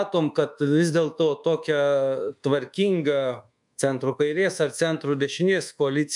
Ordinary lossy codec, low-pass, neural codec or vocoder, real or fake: MP3, 96 kbps; 10.8 kHz; codec, 24 kHz, 1.2 kbps, DualCodec; fake